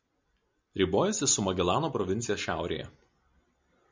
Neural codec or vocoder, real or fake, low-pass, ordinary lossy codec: none; real; 7.2 kHz; MP3, 64 kbps